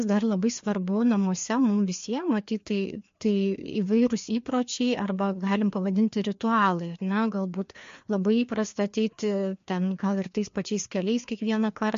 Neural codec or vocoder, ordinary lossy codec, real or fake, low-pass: codec, 16 kHz, 2 kbps, FreqCodec, larger model; MP3, 48 kbps; fake; 7.2 kHz